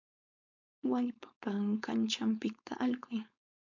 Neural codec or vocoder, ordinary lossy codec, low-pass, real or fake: codec, 16 kHz, 4.8 kbps, FACodec; MP3, 64 kbps; 7.2 kHz; fake